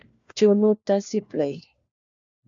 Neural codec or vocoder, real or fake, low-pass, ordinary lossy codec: codec, 16 kHz, 1 kbps, FunCodec, trained on LibriTTS, 50 frames a second; fake; 7.2 kHz; MP3, 64 kbps